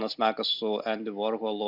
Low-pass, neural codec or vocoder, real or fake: 5.4 kHz; none; real